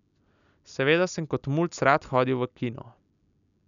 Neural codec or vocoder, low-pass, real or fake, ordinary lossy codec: none; 7.2 kHz; real; none